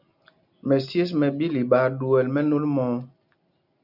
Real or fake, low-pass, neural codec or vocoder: real; 5.4 kHz; none